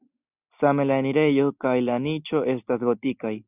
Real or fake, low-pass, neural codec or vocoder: real; 3.6 kHz; none